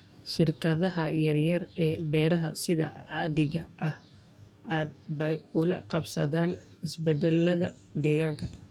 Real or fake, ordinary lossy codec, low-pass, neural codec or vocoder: fake; none; 19.8 kHz; codec, 44.1 kHz, 2.6 kbps, DAC